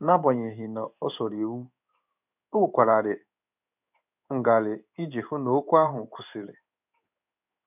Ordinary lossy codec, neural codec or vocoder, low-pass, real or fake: none; codec, 16 kHz in and 24 kHz out, 1 kbps, XY-Tokenizer; 3.6 kHz; fake